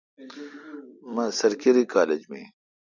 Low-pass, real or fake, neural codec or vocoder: 7.2 kHz; real; none